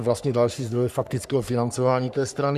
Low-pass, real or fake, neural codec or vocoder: 14.4 kHz; fake; codec, 44.1 kHz, 3.4 kbps, Pupu-Codec